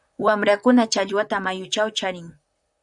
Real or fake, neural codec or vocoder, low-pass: fake; vocoder, 44.1 kHz, 128 mel bands, Pupu-Vocoder; 10.8 kHz